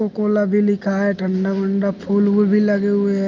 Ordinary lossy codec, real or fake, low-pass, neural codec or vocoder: Opus, 24 kbps; real; 7.2 kHz; none